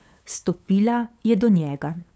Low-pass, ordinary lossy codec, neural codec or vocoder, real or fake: none; none; codec, 16 kHz, 8 kbps, FunCodec, trained on LibriTTS, 25 frames a second; fake